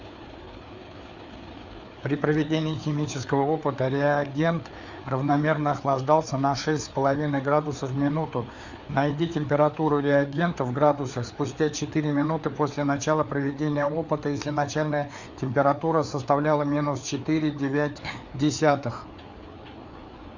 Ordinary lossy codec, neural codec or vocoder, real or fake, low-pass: Opus, 64 kbps; codec, 16 kHz, 4 kbps, FreqCodec, larger model; fake; 7.2 kHz